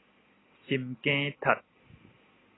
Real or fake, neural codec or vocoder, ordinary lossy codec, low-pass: real; none; AAC, 16 kbps; 7.2 kHz